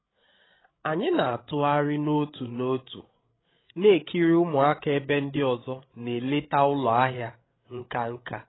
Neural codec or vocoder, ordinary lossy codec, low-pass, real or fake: codec, 16 kHz, 8 kbps, FreqCodec, larger model; AAC, 16 kbps; 7.2 kHz; fake